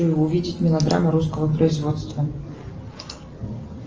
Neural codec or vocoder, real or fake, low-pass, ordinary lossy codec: none; real; 7.2 kHz; Opus, 24 kbps